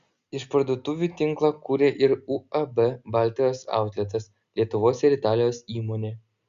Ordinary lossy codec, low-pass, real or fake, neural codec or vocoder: Opus, 64 kbps; 7.2 kHz; real; none